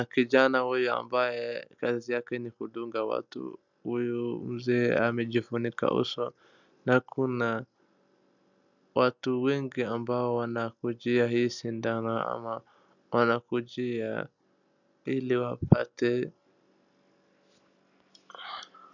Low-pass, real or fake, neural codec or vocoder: 7.2 kHz; real; none